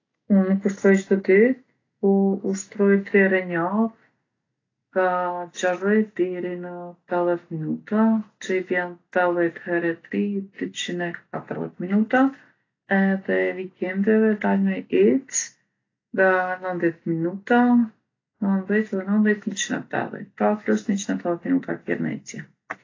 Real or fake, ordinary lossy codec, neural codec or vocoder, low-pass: real; AAC, 32 kbps; none; 7.2 kHz